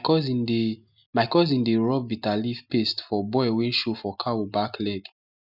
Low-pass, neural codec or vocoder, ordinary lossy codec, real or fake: 5.4 kHz; none; none; real